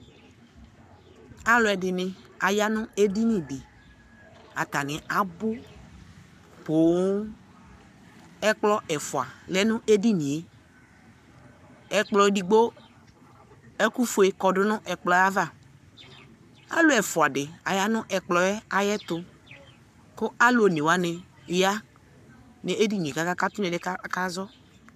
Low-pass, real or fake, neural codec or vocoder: 14.4 kHz; fake; codec, 44.1 kHz, 7.8 kbps, Pupu-Codec